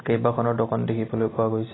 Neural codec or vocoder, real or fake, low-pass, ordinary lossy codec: none; real; 7.2 kHz; AAC, 16 kbps